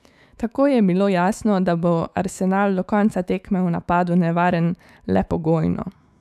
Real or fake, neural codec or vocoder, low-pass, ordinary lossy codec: fake; autoencoder, 48 kHz, 128 numbers a frame, DAC-VAE, trained on Japanese speech; 14.4 kHz; none